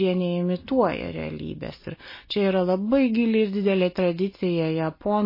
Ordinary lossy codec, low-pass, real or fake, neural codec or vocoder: MP3, 24 kbps; 5.4 kHz; real; none